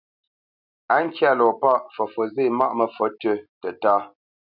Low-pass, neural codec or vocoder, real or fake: 5.4 kHz; none; real